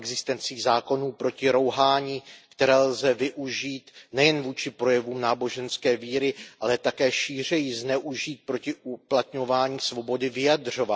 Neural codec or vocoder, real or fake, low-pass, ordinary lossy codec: none; real; none; none